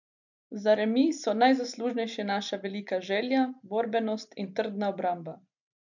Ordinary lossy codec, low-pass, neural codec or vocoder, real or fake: none; 7.2 kHz; none; real